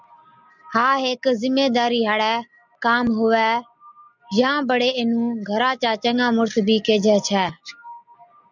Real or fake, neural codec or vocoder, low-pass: real; none; 7.2 kHz